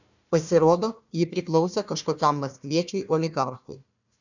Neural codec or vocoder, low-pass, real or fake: codec, 16 kHz, 1 kbps, FunCodec, trained on Chinese and English, 50 frames a second; 7.2 kHz; fake